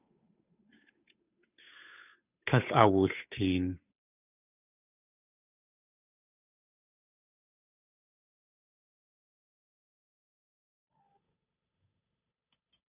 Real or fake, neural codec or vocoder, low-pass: fake; codec, 16 kHz, 8 kbps, FunCodec, trained on Chinese and English, 25 frames a second; 3.6 kHz